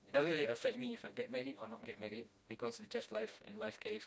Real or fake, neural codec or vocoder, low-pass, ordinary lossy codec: fake; codec, 16 kHz, 1 kbps, FreqCodec, smaller model; none; none